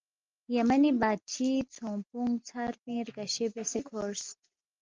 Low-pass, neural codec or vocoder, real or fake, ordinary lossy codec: 7.2 kHz; none; real; Opus, 24 kbps